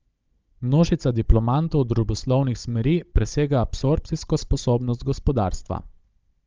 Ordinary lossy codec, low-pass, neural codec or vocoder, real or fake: Opus, 24 kbps; 7.2 kHz; codec, 16 kHz, 16 kbps, FunCodec, trained on Chinese and English, 50 frames a second; fake